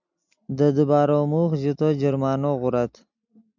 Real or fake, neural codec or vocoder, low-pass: real; none; 7.2 kHz